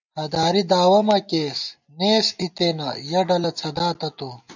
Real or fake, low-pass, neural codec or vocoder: real; 7.2 kHz; none